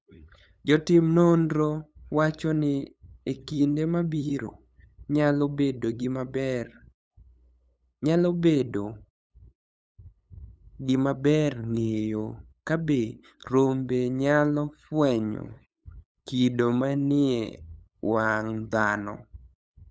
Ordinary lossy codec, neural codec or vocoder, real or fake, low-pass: none; codec, 16 kHz, 8 kbps, FunCodec, trained on LibriTTS, 25 frames a second; fake; none